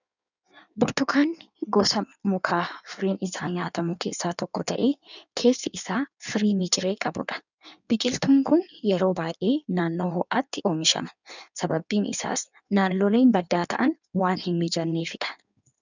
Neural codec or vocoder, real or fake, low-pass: codec, 16 kHz in and 24 kHz out, 1.1 kbps, FireRedTTS-2 codec; fake; 7.2 kHz